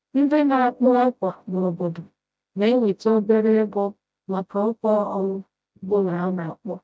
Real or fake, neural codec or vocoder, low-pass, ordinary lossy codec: fake; codec, 16 kHz, 0.5 kbps, FreqCodec, smaller model; none; none